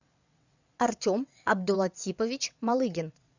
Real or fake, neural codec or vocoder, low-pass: fake; vocoder, 22.05 kHz, 80 mel bands, WaveNeXt; 7.2 kHz